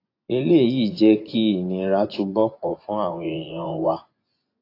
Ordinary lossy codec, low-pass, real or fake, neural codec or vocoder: AAC, 32 kbps; 5.4 kHz; fake; vocoder, 24 kHz, 100 mel bands, Vocos